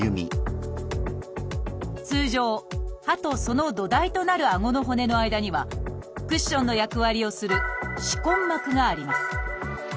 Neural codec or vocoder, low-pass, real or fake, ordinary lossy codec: none; none; real; none